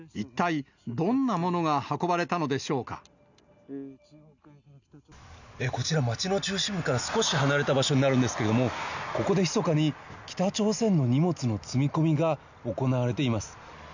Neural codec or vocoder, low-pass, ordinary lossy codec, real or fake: none; 7.2 kHz; none; real